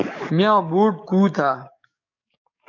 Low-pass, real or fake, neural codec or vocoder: 7.2 kHz; fake; codec, 44.1 kHz, 7.8 kbps, DAC